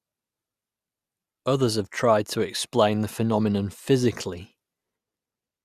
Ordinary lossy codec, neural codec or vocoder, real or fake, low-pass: Opus, 64 kbps; none; real; 14.4 kHz